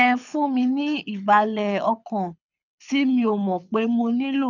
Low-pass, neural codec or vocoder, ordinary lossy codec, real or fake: 7.2 kHz; codec, 24 kHz, 6 kbps, HILCodec; none; fake